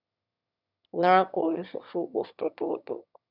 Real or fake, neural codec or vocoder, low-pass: fake; autoencoder, 22.05 kHz, a latent of 192 numbers a frame, VITS, trained on one speaker; 5.4 kHz